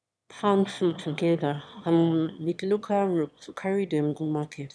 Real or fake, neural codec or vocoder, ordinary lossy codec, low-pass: fake; autoencoder, 22.05 kHz, a latent of 192 numbers a frame, VITS, trained on one speaker; none; none